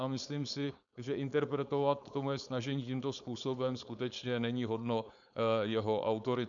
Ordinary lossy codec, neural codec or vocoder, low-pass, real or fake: MP3, 96 kbps; codec, 16 kHz, 4.8 kbps, FACodec; 7.2 kHz; fake